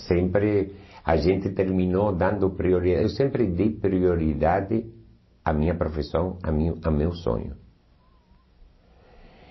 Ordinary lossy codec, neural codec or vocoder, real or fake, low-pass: MP3, 24 kbps; none; real; 7.2 kHz